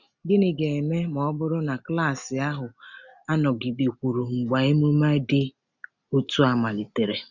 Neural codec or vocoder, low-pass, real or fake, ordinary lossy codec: none; 7.2 kHz; real; none